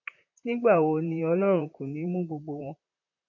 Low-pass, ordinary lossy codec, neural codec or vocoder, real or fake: 7.2 kHz; AAC, 48 kbps; vocoder, 44.1 kHz, 128 mel bands, Pupu-Vocoder; fake